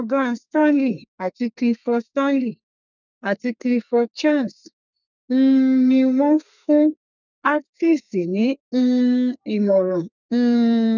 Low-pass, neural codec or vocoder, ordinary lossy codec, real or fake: 7.2 kHz; codec, 32 kHz, 1.9 kbps, SNAC; none; fake